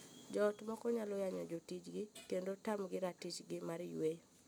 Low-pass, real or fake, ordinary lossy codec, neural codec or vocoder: none; real; none; none